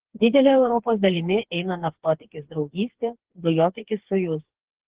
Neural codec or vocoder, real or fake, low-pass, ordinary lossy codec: codec, 16 kHz, 4 kbps, FreqCodec, smaller model; fake; 3.6 kHz; Opus, 16 kbps